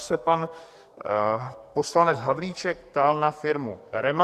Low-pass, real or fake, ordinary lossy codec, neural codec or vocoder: 14.4 kHz; fake; Opus, 64 kbps; codec, 44.1 kHz, 2.6 kbps, SNAC